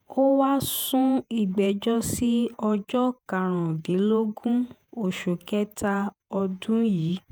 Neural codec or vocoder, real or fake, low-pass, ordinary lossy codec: vocoder, 48 kHz, 128 mel bands, Vocos; fake; none; none